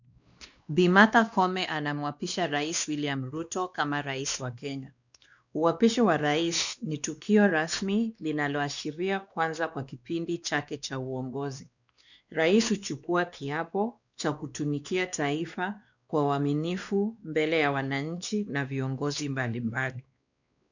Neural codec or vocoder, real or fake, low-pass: codec, 16 kHz, 2 kbps, X-Codec, WavLM features, trained on Multilingual LibriSpeech; fake; 7.2 kHz